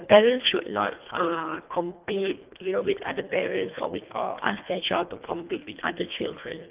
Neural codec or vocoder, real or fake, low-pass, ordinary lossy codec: codec, 24 kHz, 1.5 kbps, HILCodec; fake; 3.6 kHz; Opus, 24 kbps